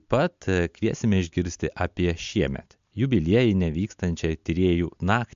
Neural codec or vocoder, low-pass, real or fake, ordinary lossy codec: none; 7.2 kHz; real; MP3, 64 kbps